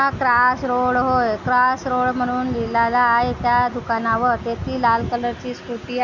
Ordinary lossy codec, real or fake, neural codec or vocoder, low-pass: none; real; none; 7.2 kHz